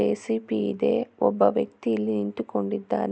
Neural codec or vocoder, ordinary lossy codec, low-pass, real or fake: none; none; none; real